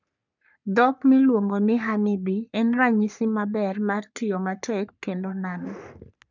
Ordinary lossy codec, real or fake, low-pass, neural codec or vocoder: none; fake; 7.2 kHz; codec, 44.1 kHz, 3.4 kbps, Pupu-Codec